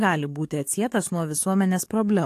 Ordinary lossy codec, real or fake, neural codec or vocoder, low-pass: AAC, 64 kbps; fake; codec, 44.1 kHz, 7.8 kbps, DAC; 14.4 kHz